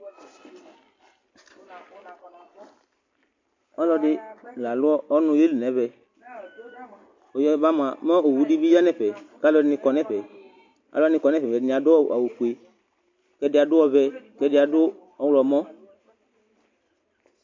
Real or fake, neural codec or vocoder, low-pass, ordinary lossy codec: real; none; 7.2 kHz; MP3, 48 kbps